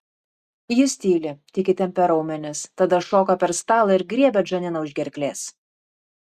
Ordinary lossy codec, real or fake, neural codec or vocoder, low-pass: Opus, 64 kbps; real; none; 14.4 kHz